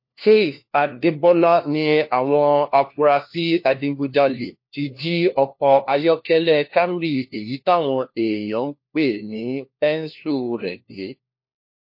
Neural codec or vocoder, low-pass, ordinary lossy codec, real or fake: codec, 16 kHz, 1 kbps, FunCodec, trained on LibriTTS, 50 frames a second; 5.4 kHz; MP3, 32 kbps; fake